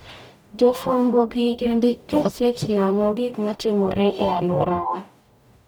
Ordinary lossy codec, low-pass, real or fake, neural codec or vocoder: none; none; fake; codec, 44.1 kHz, 0.9 kbps, DAC